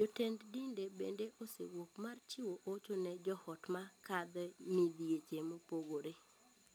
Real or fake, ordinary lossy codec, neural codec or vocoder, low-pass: real; none; none; none